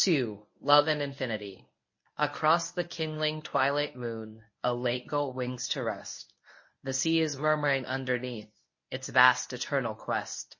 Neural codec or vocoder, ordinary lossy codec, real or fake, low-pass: codec, 24 kHz, 0.9 kbps, WavTokenizer, medium speech release version 1; MP3, 32 kbps; fake; 7.2 kHz